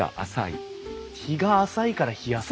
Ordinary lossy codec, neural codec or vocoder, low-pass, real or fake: none; none; none; real